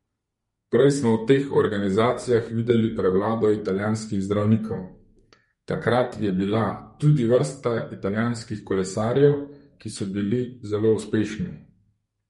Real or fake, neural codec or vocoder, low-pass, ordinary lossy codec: fake; codec, 32 kHz, 1.9 kbps, SNAC; 14.4 kHz; MP3, 48 kbps